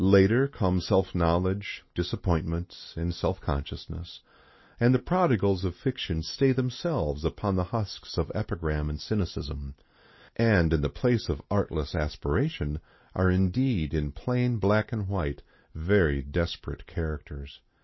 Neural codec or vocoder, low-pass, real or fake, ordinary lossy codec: none; 7.2 kHz; real; MP3, 24 kbps